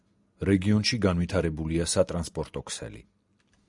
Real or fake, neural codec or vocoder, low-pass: real; none; 10.8 kHz